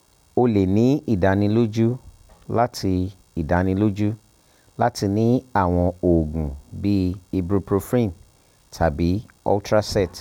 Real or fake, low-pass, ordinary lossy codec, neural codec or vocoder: real; 19.8 kHz; none; none